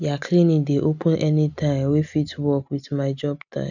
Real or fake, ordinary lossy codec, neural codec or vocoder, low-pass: real; none; none; 7.2 kHz